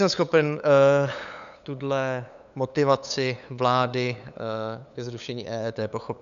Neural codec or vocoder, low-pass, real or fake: codec, 16 kHz, 8 kbps, FunCodec, trained on LibriTTS, 25 frames a second; 7.2 kHz; fake